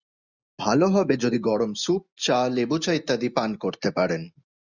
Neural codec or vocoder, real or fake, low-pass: none; real; 7.2 kHz